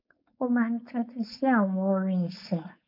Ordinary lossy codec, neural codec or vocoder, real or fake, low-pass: MP3, 48 kbps; codec, 16 kHz, 4.8 kbps, FACodec; fake; 5.4 kHz